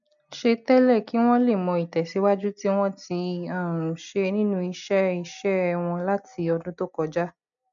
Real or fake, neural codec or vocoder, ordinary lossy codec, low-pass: real; none; none; 7.2 kHz